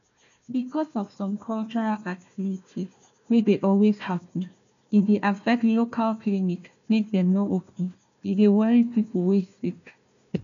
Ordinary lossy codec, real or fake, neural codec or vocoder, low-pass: none; fake; codec, 16 kHz, 1 kbps, FunCodec, trained on Chinese and English, 50 frames a second; 7.2 kHz